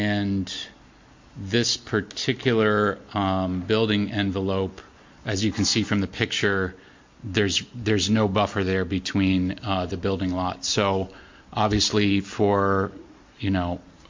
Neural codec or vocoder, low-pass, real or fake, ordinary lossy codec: none; 7.2 kHz; real; MP3, 48 kbps